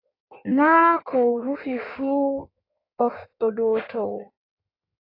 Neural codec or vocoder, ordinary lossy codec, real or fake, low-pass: codec, 16 kHz in and 24 kHz out, 1.1 kbps, FireRedTTS-2 codec; AAC, 32 kbps; fake; 5.4 kHz